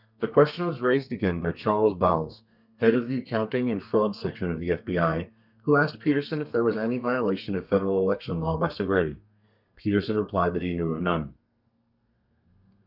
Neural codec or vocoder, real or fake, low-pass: codec, 32 kHz, 1.9 kbps, SNAC; fake; 5.4 kHz